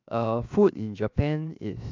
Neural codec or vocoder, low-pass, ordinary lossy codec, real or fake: codec, 16 kHz, 0.7 kbps, FocalCodec; 7.2 kHz; AAC, 48 kbps; fake